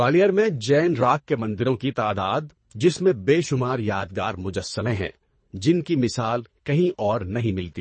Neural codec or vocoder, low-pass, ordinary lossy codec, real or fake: codec, 24 kHz, 3 kbps, HILCodec; 9.9 kHz; MP3, 32 kbps; fake